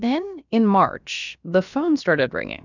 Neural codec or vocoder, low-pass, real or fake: codec, 16 kHz, about 1 kbps, DyCAST, with the encoder's durations; 7.2 kHz; fake